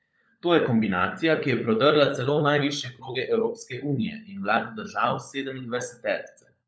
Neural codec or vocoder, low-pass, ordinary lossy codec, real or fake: codec, 16 kHz, 16 kbps, FunCodec, trained on LibriTTS, 50 frames a second; none; none; fake